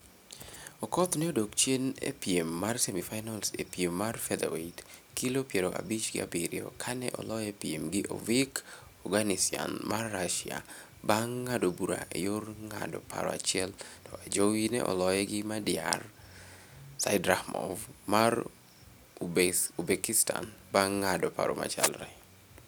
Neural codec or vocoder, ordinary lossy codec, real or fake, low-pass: none; none; real; none